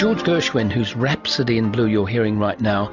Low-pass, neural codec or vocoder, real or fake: 7.2 kHz; none; real